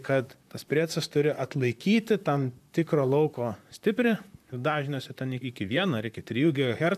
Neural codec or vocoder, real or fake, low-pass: vocoder, 44.1 kHz, 128 mel bands, Pupu-Vocoder; fake; 14.4 kHz